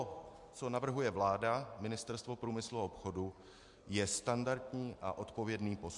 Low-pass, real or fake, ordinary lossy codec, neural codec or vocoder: 10.8 kHz; real; MP3, 64 kbps; none